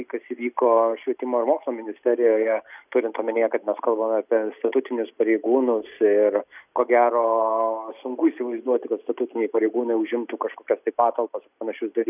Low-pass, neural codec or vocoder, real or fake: 3.6 kHz; none; real